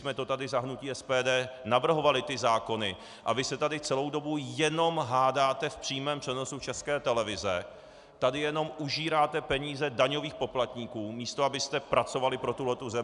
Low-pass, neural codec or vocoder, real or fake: 10.8 kHz; none; real